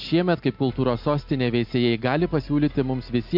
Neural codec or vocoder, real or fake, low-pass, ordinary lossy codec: none; real; 5.4 kHz; MP3, 48 kbps